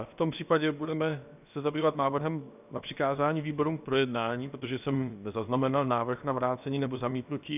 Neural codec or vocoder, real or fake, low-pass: codec, 16 kHz, 0.7 kbps, FocalCodec; fake; 3.6 kHz